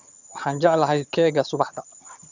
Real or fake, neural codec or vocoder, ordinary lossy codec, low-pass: fake; vocoder, 22.05 kHz, 80 mel bands, HiFi-GAN; none; 7.2 kHz